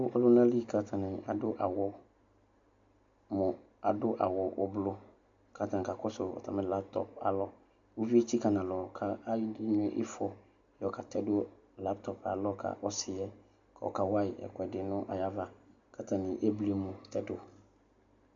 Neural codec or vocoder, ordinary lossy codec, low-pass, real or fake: none; MP3, 64 kbps; 7.2 kHz; real